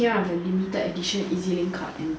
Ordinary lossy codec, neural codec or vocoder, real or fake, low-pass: none; none; real; none